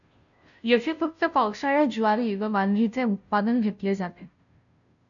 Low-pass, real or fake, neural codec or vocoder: 7.2 kHz; fake; codec, 16 kHz, 0.5 kbps, FunCodec, trained on Chinese and English, 25 frames a second